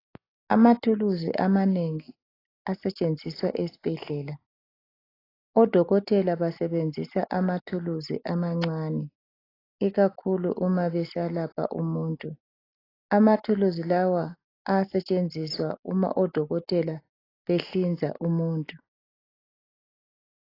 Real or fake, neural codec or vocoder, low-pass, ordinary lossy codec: real; none; 5.4 kHz; AAC, 24 kbps